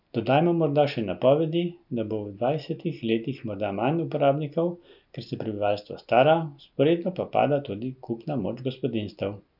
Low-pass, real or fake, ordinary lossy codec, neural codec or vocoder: 5.4 kHz; real; none; none